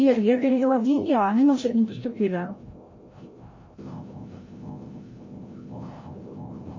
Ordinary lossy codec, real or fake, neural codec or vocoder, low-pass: MP3, 32 kbps; fake; codec, 16 kHz, 0.5 kbps, FreqCodec, larger model; 7.2 kHz